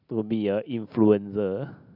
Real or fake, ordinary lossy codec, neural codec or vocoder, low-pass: real; none; none; 5.4 kHz